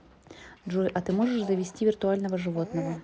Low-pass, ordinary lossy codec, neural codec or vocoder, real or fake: none; none; none; real